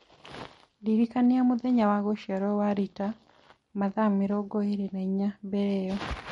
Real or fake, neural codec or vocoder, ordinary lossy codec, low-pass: real; none; MP3, 48 kbps; 19.8 kHz